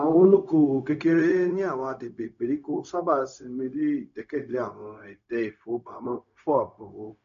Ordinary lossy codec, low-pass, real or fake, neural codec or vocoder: AAC, 96 kbps; 7.2 kHz; fake; codec, 16 kHz, 0.4 kbps, LongCat-Audio-Codec